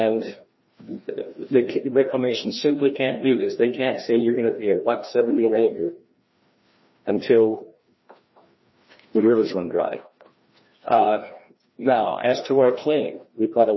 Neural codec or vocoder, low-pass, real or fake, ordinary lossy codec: codec, 16 kHz, 1 kbps, FreqCodec, larger model; 7.2 kHz; fake; MP3, 24 kbps